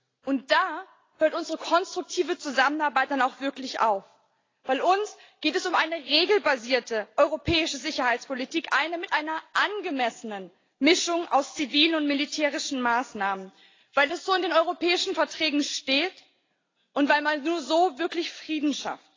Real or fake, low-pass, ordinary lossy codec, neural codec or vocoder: real; 7.2 kHz; AAC, 32 kbps; none